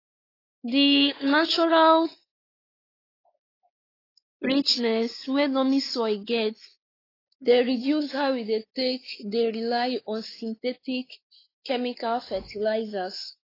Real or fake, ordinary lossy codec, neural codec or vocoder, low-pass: fake; AAC, 24 kbps; codec, 16 kHz, 4 kbps, X-Codec, WavLM features, trained on Multilingual LibriSpeech; 5.4 kHz